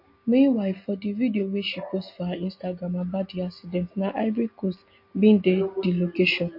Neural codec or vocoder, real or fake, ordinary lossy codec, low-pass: none; real; MP3, 32 kbps; 5.4 kHz